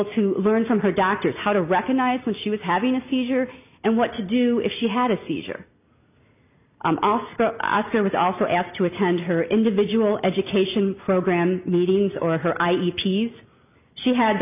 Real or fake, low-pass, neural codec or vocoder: real; 3.6 kHz; none